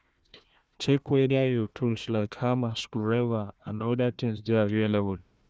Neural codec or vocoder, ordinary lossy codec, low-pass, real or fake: codec, 16 kHz, 1 kbps, FunCodec, trained on Chinese and English, 50 frames a second; none; none; fake